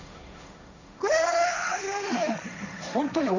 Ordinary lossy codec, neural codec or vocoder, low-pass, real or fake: none; codec, 16 kHz, 1.1 kbps, Voila-Tokenizer; 7.2 kHz; fake